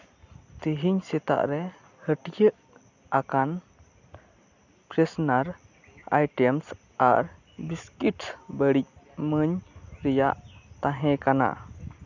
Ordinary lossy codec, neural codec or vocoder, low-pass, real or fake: none; none; 7.2 kHz; real